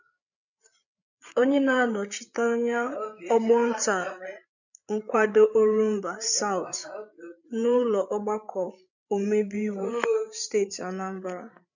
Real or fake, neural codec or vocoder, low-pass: fake; codec, 16 kHz, 8 kbps, FreqCodec, larger model; 7.2 kHz